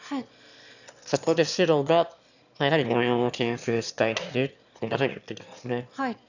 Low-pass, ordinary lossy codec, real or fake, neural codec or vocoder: 7.2 kHz; none; fake; autoencoder, 22.05 kHz, a latent of 192 numbers a frame, VITS, trained on one speaker